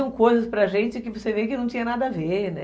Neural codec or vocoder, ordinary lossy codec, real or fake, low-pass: none; none; real; none